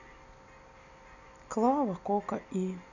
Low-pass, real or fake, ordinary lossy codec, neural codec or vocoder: 7.2 kHz; real; none; none